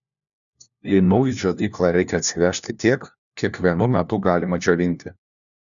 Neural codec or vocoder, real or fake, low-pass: codec, 16 kHz, 1 kbps, FunCodec, trained on LibriTTS, 50 frames a second; fake; 7.2 kHz